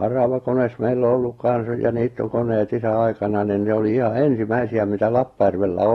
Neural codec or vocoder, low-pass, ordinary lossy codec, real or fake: none; 19.8 kHz; AAC, 32 kbps; real